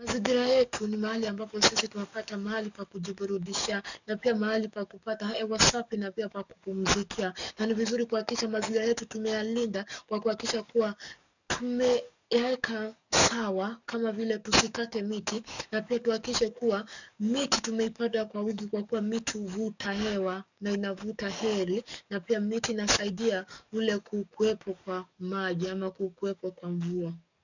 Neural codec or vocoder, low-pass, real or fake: codec, 44.1 kHz, 7.8 kbps, DAC; 7.2 kHz; fake